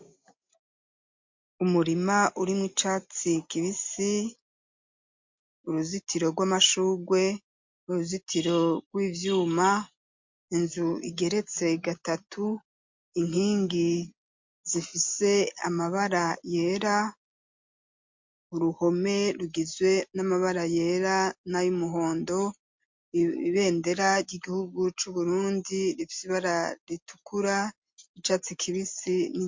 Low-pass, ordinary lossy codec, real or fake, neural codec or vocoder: 7.2 kHz; MP3, 48 kbps; real; none